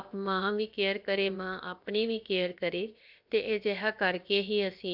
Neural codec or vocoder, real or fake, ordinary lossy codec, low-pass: codec, 16 kHz, 0.7 kbps, FocalCodec; fake; MP3, 48 kbps; 5.4 kHz